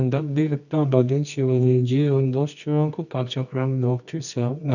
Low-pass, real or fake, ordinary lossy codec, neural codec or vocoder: 7.2 kHz; fake; none; codec, 24 kHz, 0.9 kbps, WavTokenizer, medium music audio release